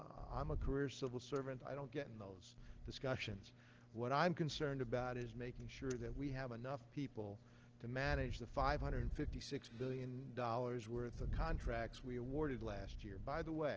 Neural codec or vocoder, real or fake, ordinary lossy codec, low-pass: none; real; Opus, 16 kbps; 7.2 kHz